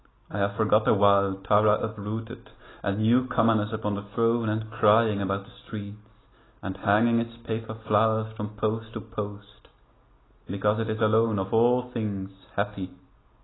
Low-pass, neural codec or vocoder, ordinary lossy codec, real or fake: 7.2 kHz; none; AAC, 16 kbps; real